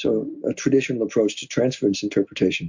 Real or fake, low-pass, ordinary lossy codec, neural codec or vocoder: real; 7.2 kHz; MP3, 64 kbps; none